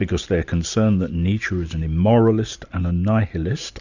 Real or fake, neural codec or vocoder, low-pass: real; none; 7.2 kHz